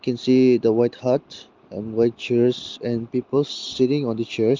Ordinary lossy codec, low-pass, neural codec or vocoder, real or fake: Opus, 32 kbps; 7.2 kHz; none; real